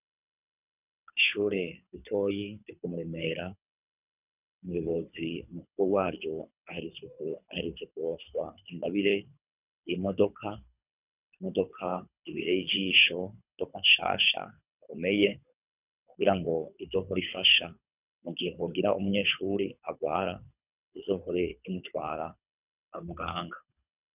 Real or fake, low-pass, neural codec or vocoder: fake; 3.6 kHz; codec, 24 kHz, 3 kbps, HILCodec